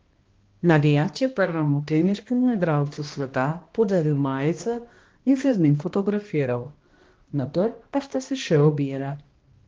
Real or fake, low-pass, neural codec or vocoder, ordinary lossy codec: fake; 7.2 kHz; codec, 16 kHz, 1 kbps, X-Codec, HuBERT features, trained on balanced general audio; Opus, 16 kbps